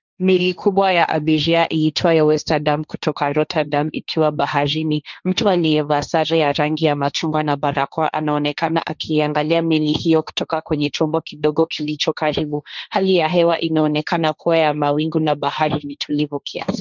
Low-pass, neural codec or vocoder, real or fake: 7.2 kHz; codec, 16 kHz, 1.1 kbps, Voila-Tokenizer; fake